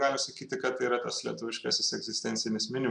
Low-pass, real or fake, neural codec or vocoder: 9.9 kHz; real; none